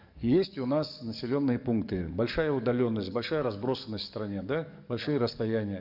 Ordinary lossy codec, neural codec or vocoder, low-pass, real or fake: none; codec, 44.1 kHz, 7.8 kbps, DAC; 5.4 kHz; fake